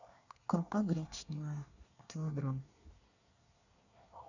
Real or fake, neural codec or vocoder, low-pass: fake; codec, 24 kHz, 1 kbps, SNAC; 7.2 kHz